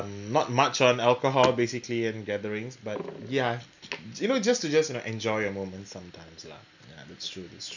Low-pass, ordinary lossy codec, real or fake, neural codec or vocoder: 7.2 kHz; none; real; none